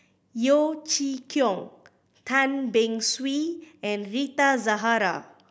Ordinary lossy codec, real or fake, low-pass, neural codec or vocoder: none; real; none; none